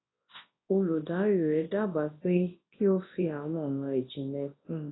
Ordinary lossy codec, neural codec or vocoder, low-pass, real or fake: AAC, 16 kbps; codec, 24 kHz, 0.9 kbps, WavTokenizer, large speech release; 7.2 kHz; fake